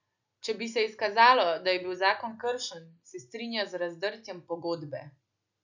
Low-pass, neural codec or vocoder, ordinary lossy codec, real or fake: 7.2 kHz; none; none; real